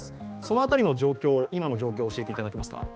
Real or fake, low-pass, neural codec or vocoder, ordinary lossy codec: fake; none; codec, 16 kHz, 2 kbps, X-Codec, HuBERT features, trained on balanced general audio; none